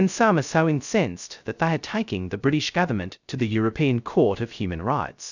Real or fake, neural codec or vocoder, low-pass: fake; codec, 16 kHz, 0.2 kbps, FocalCodec; 7.2 kHz